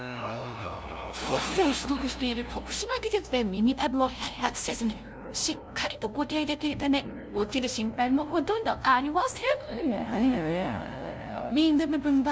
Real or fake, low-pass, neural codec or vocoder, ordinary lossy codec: fake; none; codec, 16 kHz, 0.5 kbps, FunCodec, trained on LibriTTS, 25 frames a second; none